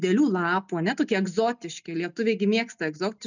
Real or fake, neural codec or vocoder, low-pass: real; none; 7.2 kHz